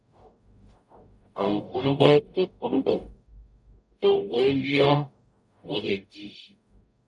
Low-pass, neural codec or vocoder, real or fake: 10.8 kHz; codec, 44.1 kHz, 0.9 kbps, DAC; fake